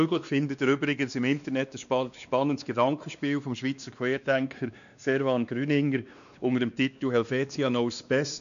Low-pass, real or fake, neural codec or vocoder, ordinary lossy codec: 7.2 kHz; fake; codec, 16 kHz, 2 kbps, X-Codec, WavLM features, trained on Multilingual LibriSpeech; none